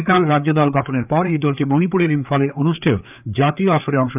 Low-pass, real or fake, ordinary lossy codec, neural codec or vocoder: 3.6 kHz; fake; none; codec, 16 kHz, 4 kbps, FreqCodec, larger model